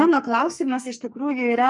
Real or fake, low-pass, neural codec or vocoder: fake; 10.8 kHz; codec, 44.1 kHz, 2.6 kbps, SNAC